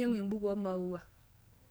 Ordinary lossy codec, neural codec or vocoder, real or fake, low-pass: none; codec, 44.1 kHz, 2.6 kbps, SNAC; fake; none